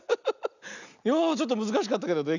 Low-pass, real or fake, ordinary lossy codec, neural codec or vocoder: 7.2 kHz; real; none; none